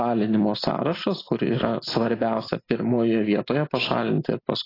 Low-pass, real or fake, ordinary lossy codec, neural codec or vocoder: 5.4 kHz; real; AAC, 24 kbps; none